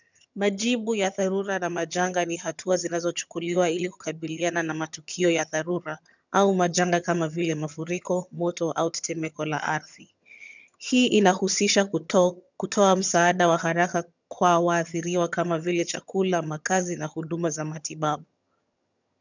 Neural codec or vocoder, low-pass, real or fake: vocoder, 22.05 kHz, 80 mel bands, HiFi-GAN; 7.2 kHz; fake